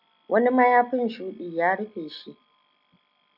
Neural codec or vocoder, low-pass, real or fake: none; 5.4 kHz; real